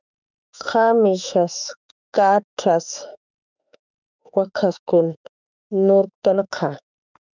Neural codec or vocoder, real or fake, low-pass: autoencoder, 48 kHz, 32 numbers a frame, DAC-VAE, trained on Japanese speech; fake; 7.2 kHz